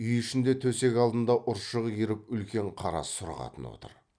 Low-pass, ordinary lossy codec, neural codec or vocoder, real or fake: 9.9 kHz; none; none; real